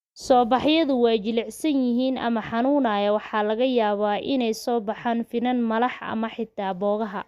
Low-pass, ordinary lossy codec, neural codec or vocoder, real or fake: 14.4 kHz; Opus, 64 kbps; none; real